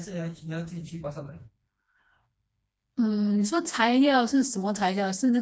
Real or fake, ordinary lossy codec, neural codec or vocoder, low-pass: fake; none; codec, 16 kHz, 2 kbps, FreqCodec, smaller model; none